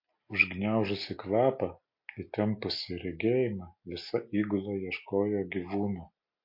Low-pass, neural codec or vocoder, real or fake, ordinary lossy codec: 5.4 kHz; none; real; MP3, 32 kbps